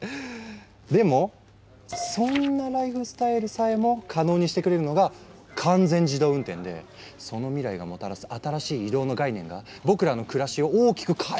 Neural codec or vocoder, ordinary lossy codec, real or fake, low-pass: none; none; real; none